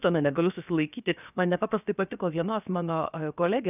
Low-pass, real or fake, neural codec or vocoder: 3.6 kHz; fake; codec, 16 kHz, 0.7 kbps, FocalCodec